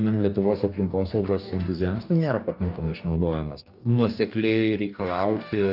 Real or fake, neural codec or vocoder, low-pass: fake; codec, 44.1 kHz, 2.6 kbps, DAC; 5.4 kHz